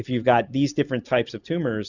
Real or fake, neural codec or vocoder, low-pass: real; none; 7.2 kHz